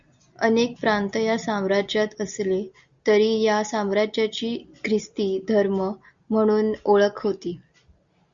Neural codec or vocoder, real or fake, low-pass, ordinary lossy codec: none; real; 7.2 kHz; Opus, 64 kbps